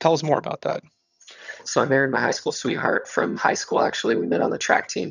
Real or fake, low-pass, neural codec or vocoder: fake; 7.2 kHz; vocoder, 22.05 kHz, 80 mel bands, HiFi-GAN